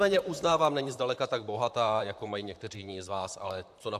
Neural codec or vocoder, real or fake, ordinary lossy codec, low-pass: vocoder, 44.1 kHz, 128 mel bands, Pupu-Vocoder; fake; AAC, 96 kbps; 14.4 kHz